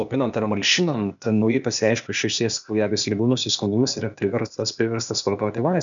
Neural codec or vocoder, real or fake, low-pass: codec, 16 kHz, 0.8 kbps, ZipCodec; fake; 7.2 kHz